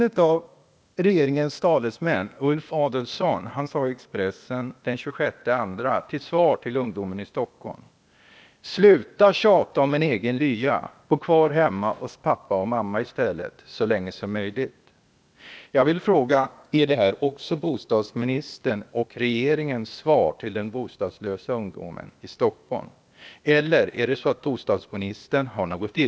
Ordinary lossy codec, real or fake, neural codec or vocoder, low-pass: none; fake; codec, 16 kHz, 0.8 kbps, ZipCodec; none